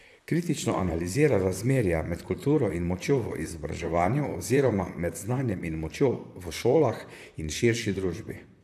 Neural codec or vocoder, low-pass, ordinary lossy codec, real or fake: vocoder, 44.1 kHz, 128 mel bands, Pupu-Vocoder; 14.4 kHz; AAC, 96 kbps; fake